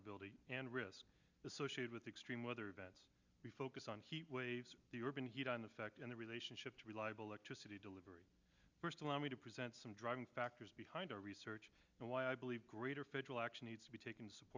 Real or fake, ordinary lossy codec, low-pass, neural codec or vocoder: real; Opus, 64 kbps; 7.2 kHz; none